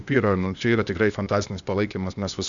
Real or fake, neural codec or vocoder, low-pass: fake; codec, 16 kHz, 0.8 kbps, ZipCodec; 7.2 kHz